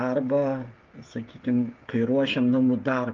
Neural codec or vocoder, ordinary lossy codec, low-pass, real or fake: codec, 16 kHz, 16 kbps, FreqCodec, smaller model; Opus, 32 kbps; 7.2 kHz; fake